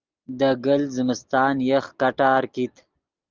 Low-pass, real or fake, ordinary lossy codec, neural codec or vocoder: 7.2 kHz; real; Opus, 32 kbps; none